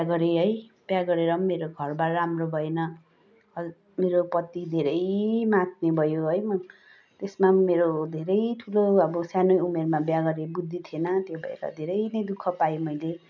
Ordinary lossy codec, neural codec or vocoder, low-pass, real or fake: none; none; 7.2 kHz; real